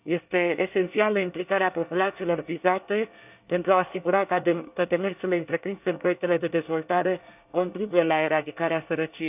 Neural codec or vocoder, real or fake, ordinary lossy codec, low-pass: codec, 24 kHz, 1 kbps, SNAC; fake; none; 3.6 kHz